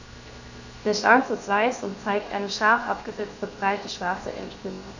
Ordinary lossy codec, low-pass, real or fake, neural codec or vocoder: none; 7.2 kHz; fake; codec, 16 kHz, 0.7 kbps, FocalCodec